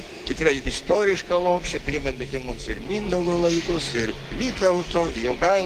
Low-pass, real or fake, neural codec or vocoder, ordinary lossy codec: 14.4 kHz; fake; codec, 44.1 kHz, 2.6 kbps, SNAC; Opus, 24 kbps